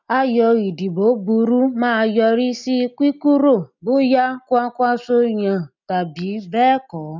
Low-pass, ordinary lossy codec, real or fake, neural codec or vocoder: 7.2 kHz; none; real; none